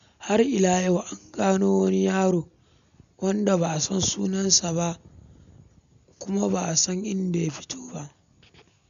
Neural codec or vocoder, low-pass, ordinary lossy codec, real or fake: none; 7.2 kHz; none; real